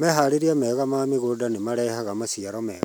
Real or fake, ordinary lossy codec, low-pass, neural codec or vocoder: real; none; none; none